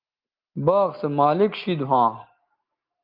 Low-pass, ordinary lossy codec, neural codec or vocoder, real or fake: 5.4 kHz; Opus, 32 kbps; none; real